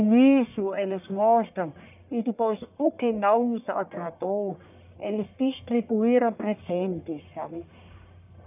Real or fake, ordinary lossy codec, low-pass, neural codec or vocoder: fake; none; 3.6 kHz; codec, 44.1 kHz, 1.7 kbps, Pupu-Codec